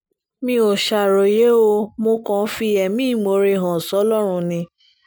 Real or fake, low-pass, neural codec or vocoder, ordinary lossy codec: real; none; none; none